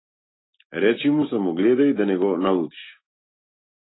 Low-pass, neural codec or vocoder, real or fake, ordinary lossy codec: 7.2 kHz; none; real; AAC, 16 kbps